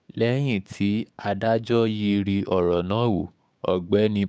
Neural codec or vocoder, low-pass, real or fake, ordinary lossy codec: codec, 16 kHz, 6 kbps, DAC; none; fake; none